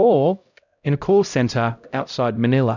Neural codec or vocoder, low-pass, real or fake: codec, 16 kHz, 0.5 kbps, X-Codec, HuBERT features, trained on LibriSpeech; 7.2 kHz; fake